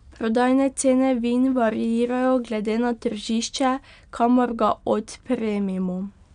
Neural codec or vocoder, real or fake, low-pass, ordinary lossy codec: none; real; 9.9 kHz; MP3, 96 kbps